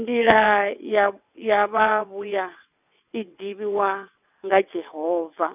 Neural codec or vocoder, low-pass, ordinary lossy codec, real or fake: vocoder, 22.05 kHz, 80 mel bands, WaveNeXt; 3.6 kHz; none; fake